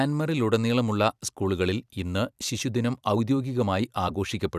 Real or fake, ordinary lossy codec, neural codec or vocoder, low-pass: fake; none; vocoder, 44.1 kHz, 128 mel bands every 256 samples, BigVGAN v2; 14.4 kHz